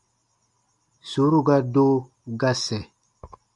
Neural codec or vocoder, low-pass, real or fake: none; 10.8 kHz; real